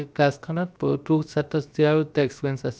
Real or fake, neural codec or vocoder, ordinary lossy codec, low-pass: fake; codec, 16 kHz, about 1 kbps, DyCAST, with the encoder's durations; none; none